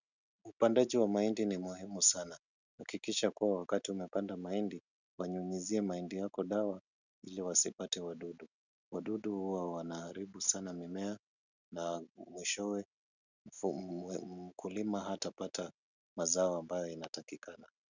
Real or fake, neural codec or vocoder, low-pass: real; none; 7.2 kHz